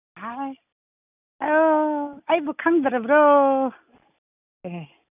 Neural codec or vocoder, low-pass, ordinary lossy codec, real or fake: none; 3.6 kHz; none; real